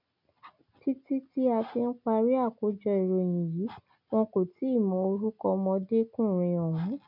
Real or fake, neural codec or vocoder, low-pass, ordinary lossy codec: real; none; 5.4 kHz; none